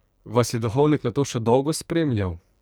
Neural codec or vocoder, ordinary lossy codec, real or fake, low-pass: codec, 44.1 kHz, 2.6 kbps, SNAC; none; fake; none